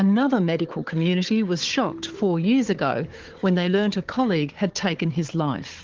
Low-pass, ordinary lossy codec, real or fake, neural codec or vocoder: 7.2 kHz; Opus, 16 kbps; fake; codec, 16 kHz, 4 kbps, FunCodec, trained on Chinese and English, 50 frames a second